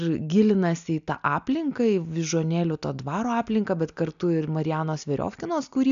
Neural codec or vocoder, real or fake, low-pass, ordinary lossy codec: none; real; 7.2 kHz; MP3, 96 kbps